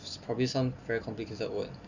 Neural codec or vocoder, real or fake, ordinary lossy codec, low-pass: none; real; none; 7.2 kHz